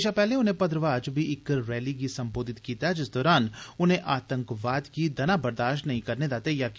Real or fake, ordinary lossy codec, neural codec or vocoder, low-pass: real; none; none; none